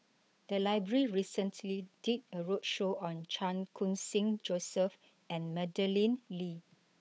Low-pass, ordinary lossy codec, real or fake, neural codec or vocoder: none; none; fake; codec, 16 kHz, 8 kbps, FunCodec, trained on Chinese and English, 25 frames a second